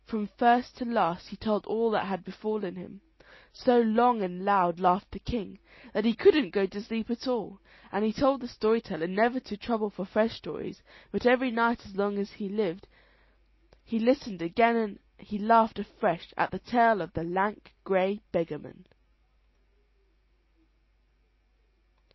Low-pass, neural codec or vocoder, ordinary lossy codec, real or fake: 7.2 kHz; none; MP3, 24 kbps; real